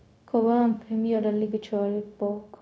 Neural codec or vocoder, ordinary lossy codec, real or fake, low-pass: codec, 16 kHz, 0.4 kbps, LongCat-Audio-Codec; none; fake; none